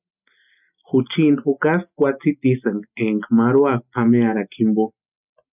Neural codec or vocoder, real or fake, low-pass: none; real; 3.6 kHz